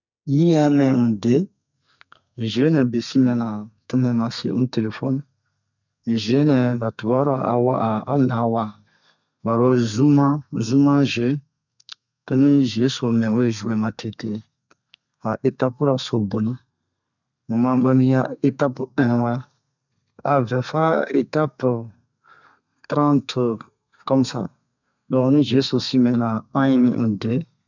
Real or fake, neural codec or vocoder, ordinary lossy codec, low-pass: fake; codec, 32 kHz, 1.9 kbps, SNAC; none; 7.2 kHz